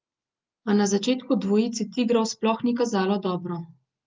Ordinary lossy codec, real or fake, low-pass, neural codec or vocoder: Opus, 32 kbps; real; 7.2 kHz; none